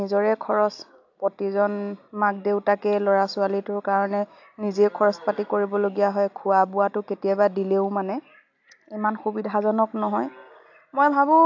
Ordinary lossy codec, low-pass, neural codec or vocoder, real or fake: AAC, 48 kbps; 7.2 kHz; none; real